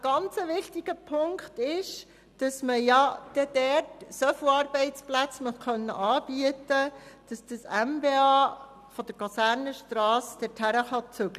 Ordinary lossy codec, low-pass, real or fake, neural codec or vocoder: none; 14.4 kHz; real; none